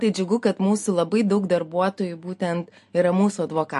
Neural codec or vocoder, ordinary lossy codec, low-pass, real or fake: none; MP3, 48 kbps; 14.4 kHz; real